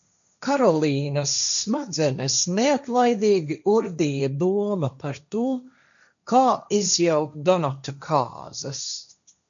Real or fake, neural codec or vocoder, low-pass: fake; codec, 16 kHz, 1.1 kbps, Voila-Tokenizer; 7.2 kHz